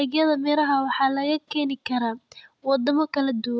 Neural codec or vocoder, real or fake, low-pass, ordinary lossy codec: none; real; none; none